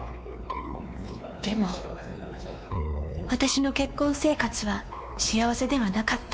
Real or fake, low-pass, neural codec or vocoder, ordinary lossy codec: fake; none; codec, 16 kHz, 2 kbps, X-Codec, WavLM features, trained on Multilingual LibriSpeech; none